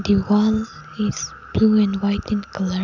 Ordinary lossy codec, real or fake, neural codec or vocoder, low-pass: none; real; none; 7.2 kHz